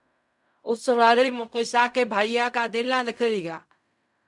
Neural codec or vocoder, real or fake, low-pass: codec, 16 kHz in and 24 kHz out, 0.4 kbps, LongCat-Audio-Codec, fine tuned four codebook decoder; fake; 10.8 kHz